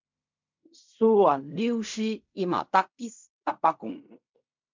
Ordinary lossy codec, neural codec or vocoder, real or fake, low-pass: MP3, 64 kbps; codec, 16 kHz in and 24 kHz out, 0.4 kbps, LongCat-Audio-Codec, fine tuned four codebook decoder; fake; 7.2 kHz